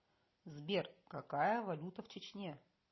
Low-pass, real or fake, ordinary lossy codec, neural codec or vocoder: 7.2 kHz; real; MP3, 24 kbps; none